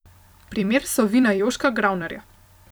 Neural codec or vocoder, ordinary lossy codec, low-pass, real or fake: vocoder, 44.1 kHz, 128 mel bands every 256 samples, BigVGAN v2; none; none; fake